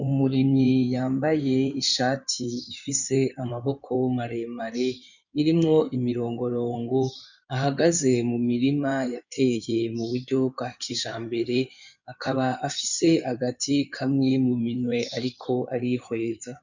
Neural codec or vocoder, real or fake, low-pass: codec, 16 kHz in and 24 kHz out, 2.2 kbps, FireRedTTS-2 codec; fake; 7.2 kHz